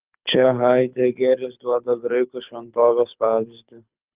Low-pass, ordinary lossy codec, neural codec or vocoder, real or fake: 3.6 kHz; Opus, 24 kbps; codec, 24 kHz, 6 kbps, HILCodec; fake